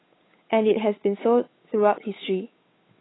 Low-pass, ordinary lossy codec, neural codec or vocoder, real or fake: 7.2 kHz; AAC, 16 kbps; codec, 16 kHz, 8 kbps, FunCodec, trained on Chinese and English, 25 frames a second; fake